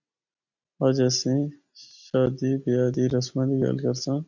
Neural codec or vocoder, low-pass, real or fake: none; 7.2 kHz; real